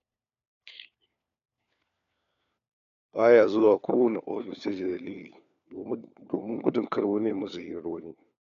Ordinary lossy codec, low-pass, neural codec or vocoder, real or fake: none; 7.2 kHz; codec, 16 kHz, 4 kbps, FunCodec, trained on LibriTTS, 50 frames a second; fake